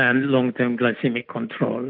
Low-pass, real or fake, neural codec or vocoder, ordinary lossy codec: 5.4 kHz; real; none; AAC, 48 kbps